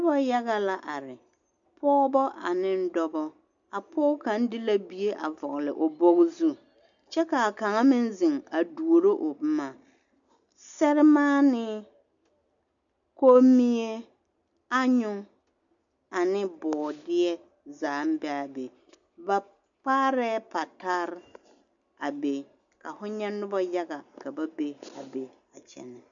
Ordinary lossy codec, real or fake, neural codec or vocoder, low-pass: MP3, 96 kbps; real; none; 7.2 kHz